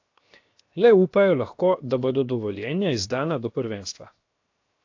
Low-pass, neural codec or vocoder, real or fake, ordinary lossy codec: 7.2 kHz; codec, 16 kHz, 0.7 kbps, FocalCodec; fake; AAC, 48 kbps